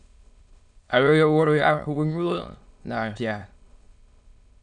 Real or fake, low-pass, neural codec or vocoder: fake; 9.9 kHz; autoencoder, 22.05 kHz, a latent of 192 numbers a frame, VITS, trained on many speakers